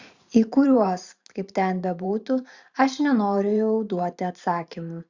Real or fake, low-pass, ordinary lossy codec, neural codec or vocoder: fake; 7.2 kHz; Opus, 64 kbps; vocoder, 44.1 kHz, 128 mel bands every 512 samples, BigVGAN v2